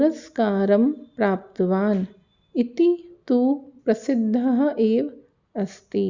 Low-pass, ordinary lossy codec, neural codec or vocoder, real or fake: 7.2 kHz; Opus, 64 kbps; none; real